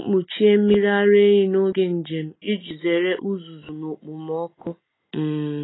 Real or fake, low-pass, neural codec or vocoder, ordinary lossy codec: real; 7.2 kHz; none; AAC, 16 kbps